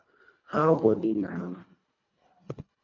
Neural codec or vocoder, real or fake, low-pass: codec, 24 kHz, 1.5 kbps, HILCodec; fake; 7.2 kHz